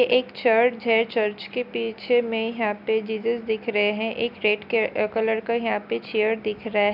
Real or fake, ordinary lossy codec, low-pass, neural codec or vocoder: real; none; 5.4 kHz; none